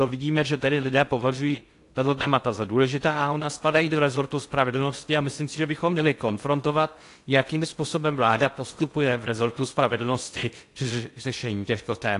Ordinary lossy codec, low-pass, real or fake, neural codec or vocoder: AAC, 48 kbps; 10.8 kHz; fake; codec, 16 kHz in and 24 kHz out, 0.6 kbps, FocalCodec, streaming, 2048 codes